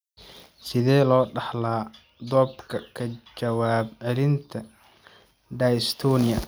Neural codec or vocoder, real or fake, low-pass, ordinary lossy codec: none; real; none; none